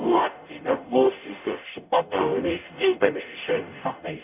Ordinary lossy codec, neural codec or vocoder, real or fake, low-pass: none; codec, 44.1 kHz, 0.9 kbps, DAC; fake; 3.6 kHz